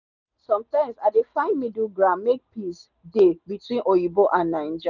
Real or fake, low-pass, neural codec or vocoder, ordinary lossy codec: real; 7.2 kHz; none; none